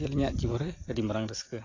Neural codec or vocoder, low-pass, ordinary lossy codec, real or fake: none; 7.2 kHz; AAC, 32 kbps; real